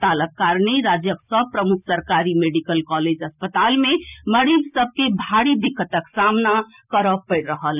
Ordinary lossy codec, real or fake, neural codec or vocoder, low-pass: none; real; none; 3.6 kHz